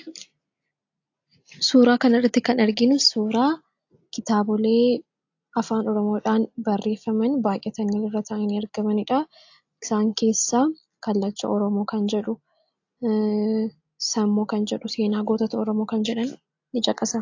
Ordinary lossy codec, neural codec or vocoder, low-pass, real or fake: AAC, 48 kbps; none; 7.2 kHz; real